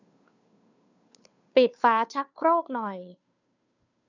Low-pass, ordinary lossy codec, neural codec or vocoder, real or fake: 7.2 kHz; none; codec, 16 kHz, 2 kbps, FunCodec, trained on Chinese and English, 25 frames a second; fake